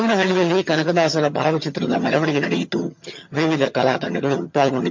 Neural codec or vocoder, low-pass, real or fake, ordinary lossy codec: vocoder, 22.05 kHz, 80 mel bands, HiFi-GAN; 7.2 kHz; fake; MP3, 48 kbps